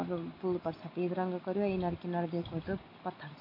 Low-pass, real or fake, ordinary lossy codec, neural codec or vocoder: 5.4 kHz; real; MP3, 32 kbps; none